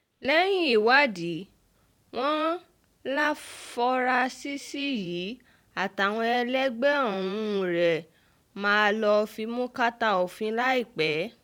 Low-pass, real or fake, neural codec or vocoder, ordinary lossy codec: 19.8 kHz; fake; vocoder, 44.1 kHz, 128 mel bands every 512 samples, BigVGAN v2; none